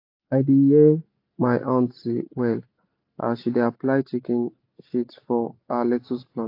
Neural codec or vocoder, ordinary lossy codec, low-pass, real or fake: none; AAC, 32 kbps; 5.4 kHz; real